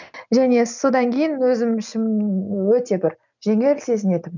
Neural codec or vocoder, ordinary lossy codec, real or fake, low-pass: none; none; real; 7.2 kHz